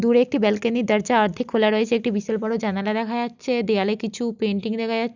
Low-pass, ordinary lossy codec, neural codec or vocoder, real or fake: 7.2 kHz; none; none; real